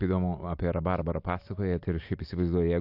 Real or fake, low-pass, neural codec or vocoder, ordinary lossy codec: real; 5.4 kHz; none; AAC, 48 kbps